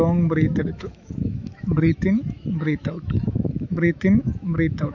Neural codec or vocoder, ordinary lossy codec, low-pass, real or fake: autoencoder, 48 kHz, 128 numbers a frame, DAC-VAE, trained on Japanese speech; none; 7.2 kHz; fake